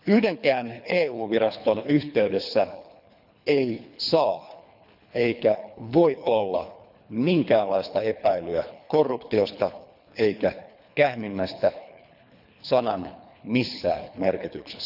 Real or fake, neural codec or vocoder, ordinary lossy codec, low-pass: fake; codec, 24 kHz, 3 kbps, HILCodec; none; 5.4 kHz